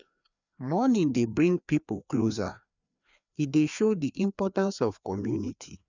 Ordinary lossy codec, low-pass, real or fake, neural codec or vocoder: none; 7.2 kHz; fake; codec, 16 kHz, 2 kbps, FreqCodec, larger model